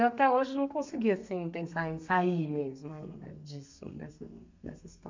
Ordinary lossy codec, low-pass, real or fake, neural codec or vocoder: MP3, 64 kbps; 7.2 kHz; fake; codec, 32 kHz, 1.9 kbps, SNAC